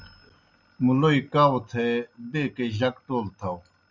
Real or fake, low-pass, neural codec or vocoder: real; 7.2 kHz; none